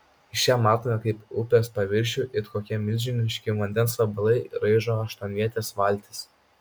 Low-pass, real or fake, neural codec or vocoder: 19.8 kHz; real; none